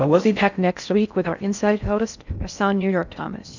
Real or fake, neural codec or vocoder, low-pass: fake; codec, 16 kHz in and 24 kHz out, 0.6 kbps, FocalCodec, streaming, 4096 codes; 7.2 kHz